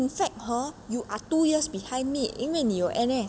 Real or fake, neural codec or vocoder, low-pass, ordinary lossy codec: real; none; none; none